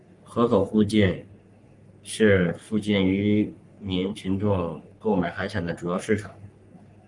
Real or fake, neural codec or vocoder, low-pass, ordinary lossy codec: fake; codec, 44.1 kHz, 3.4 kbps, Pupu-Codec; 10.8 kHz; Opus, 32 kbps